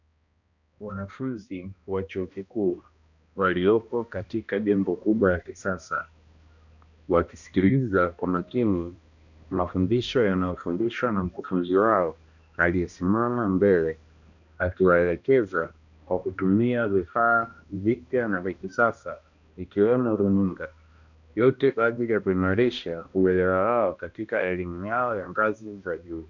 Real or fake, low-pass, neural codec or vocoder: fake; 7.2 kHz; codec, 16 kHz, 1 kbps, X-Codec, HuBERT features, trained on balanced general audio